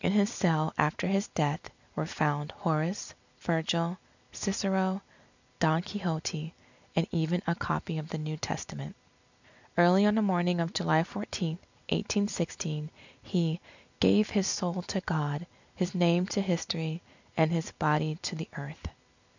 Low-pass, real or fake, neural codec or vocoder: 7.2 kHz; real; none